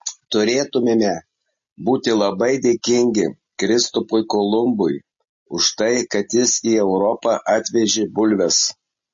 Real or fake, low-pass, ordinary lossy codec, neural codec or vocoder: real; 7.2 kHz; MP3, 32 kbps; none